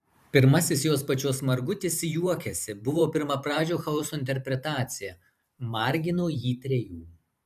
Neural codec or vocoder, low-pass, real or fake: vocoder, 44.1 kHz, 128 mel bands every 512 samples, BigVGAN v2; 14.4 kHz; fake